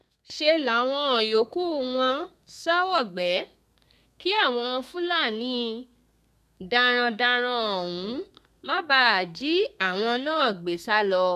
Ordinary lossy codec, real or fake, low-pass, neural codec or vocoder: none; fake; 14.4 kHz; codec, 32 kHz, 1.9 kbps, SNAC